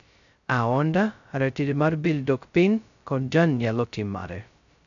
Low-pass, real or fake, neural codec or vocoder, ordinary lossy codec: 7.2 kHz; fake; codec, 16 kHz, 0.2 kbps, FocalCodec; none